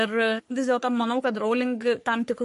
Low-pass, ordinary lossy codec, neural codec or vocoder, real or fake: 14.4 kHz; MP3, 48 kbps; codec, 44.1 kHz, 3.4 kbps, Pupu-Codec; fake